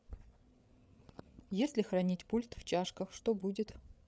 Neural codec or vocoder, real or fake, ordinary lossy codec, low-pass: codec, 16 kHz, 8 kbps, FreqCodec, larger model; fake; none; none